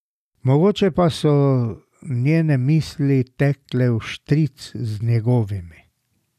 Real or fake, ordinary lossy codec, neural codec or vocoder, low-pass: real; none; none; 14.4 kHz